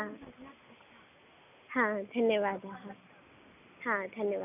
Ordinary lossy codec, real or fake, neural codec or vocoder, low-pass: none; real; none; 3.6 kHz